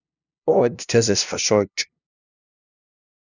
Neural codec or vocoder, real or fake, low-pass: codec, 16 kHz, 0.5 kbps, FunCodec, trained on LibriTTS, 25 frames a second; fake; 7.2 kHz